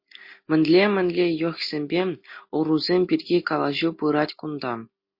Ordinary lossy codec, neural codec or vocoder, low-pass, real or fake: MP3, 32 kbps; none; 5.4 kHz; real